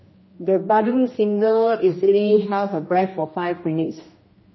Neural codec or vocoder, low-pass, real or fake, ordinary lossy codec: codec, 16 kHz, 1 kbps, X-Codec, HuBERT features, trained on general audio; 7.2 kHz; fake; MP3, 24 kbps